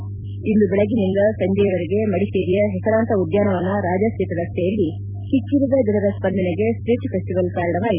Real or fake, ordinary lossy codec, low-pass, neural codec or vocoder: fake; none; 3.6 kHz; vocoder, 44.1 kHz, 128 mel bands every 256 samples, BigVGAN v2